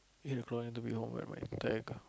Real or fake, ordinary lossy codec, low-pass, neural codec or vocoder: real; none; none; none